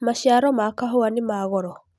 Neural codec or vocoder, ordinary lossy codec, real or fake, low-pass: none; none; real; none